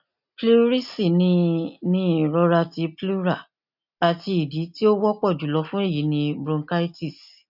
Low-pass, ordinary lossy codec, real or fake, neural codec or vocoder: 5.4 kHz; none; real; none